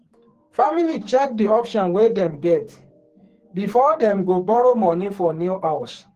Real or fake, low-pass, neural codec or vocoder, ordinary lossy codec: fake; 14.4 kHz; codec, 44.1 kHz, 2.6 kbps, SNAC; Opus, 16 kbps